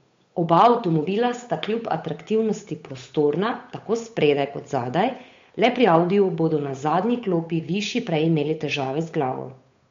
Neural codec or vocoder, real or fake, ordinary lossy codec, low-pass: codec, 16 kHz, 8 kbps, FunCodec, trained on Chinese and English, 25 frames a second; fake; MP3, 48 kbps; 7.2 kHz